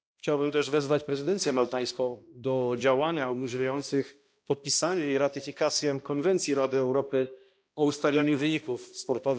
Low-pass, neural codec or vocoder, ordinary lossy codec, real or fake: none; codec, 16 kHz, 1 kbps, X-Codec, HuBERT features, trained on balanced general audio; none; fake